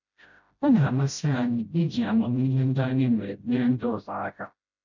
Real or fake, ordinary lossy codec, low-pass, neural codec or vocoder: fake; none; 7.2 kHz; codec, 16 kHz, 0.5 kbps, FreqCodec, smaller model